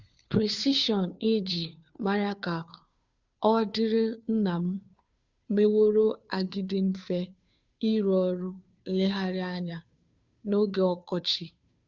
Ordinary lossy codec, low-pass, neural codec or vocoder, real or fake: Opus, 64 kbps; 7.2 kHz; codec, 16 kHz, 2 kbps, FunCodec, trained on Chinese and English, 25 frames a second; fake